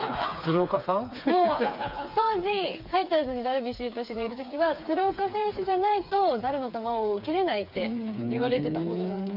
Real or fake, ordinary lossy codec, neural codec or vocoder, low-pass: fake; none; codec, 16 kHz, 4 kbps, FreqCodec, smaller model; 5.4 kHz